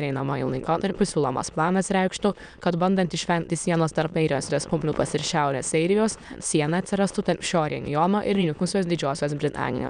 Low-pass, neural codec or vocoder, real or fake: 9.9 kHz; autoencoder, 22.05 kHz, a latent of 192 numbers a frame, VITS, trained on many speakers; fake